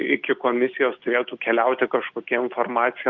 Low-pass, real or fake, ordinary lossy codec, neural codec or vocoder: 7.2 kHz; real; Opus, 24 kbps; none